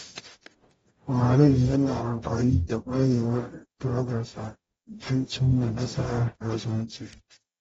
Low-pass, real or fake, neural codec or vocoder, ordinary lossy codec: 19.8 kHz; fake; codec, 44.1 kHz, 0.9 kbps, DAC; AAC, 24 kbps